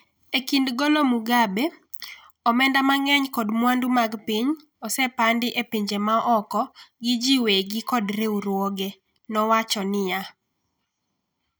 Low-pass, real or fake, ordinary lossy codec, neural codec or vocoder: none; real; none; none